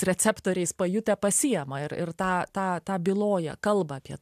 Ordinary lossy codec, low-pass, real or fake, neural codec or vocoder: AAC, 96 kbps; 14.4 kHz; real; none